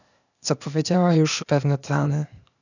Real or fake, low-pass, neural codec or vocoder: fake; 7.2 kHz; codec, 16 kHz, 0.8 kbps, ZipCodec